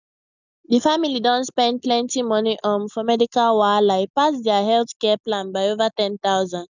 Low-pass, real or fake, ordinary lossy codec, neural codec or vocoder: 7.2 kHz; real; none; none